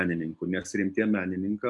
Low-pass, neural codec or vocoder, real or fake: 10.8 kHz; none; real